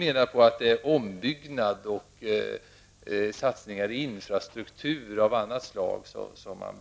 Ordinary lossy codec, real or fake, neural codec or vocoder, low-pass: none; real; none; none